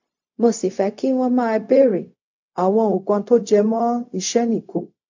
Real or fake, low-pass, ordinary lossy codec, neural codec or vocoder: fake; 7.2 kHz; MP3, 48 kbps; codec, 16 kHz, 0.4 kbps, LongCat-Audio-Codec